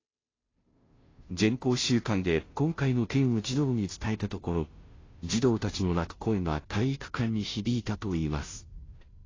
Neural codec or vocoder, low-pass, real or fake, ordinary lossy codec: codec, 16 kHz, 0.5 kbps, FunCodec, trained on Chinese and English, 25 frames a second; 7.2 kHz; fake; AAC, 32 kbps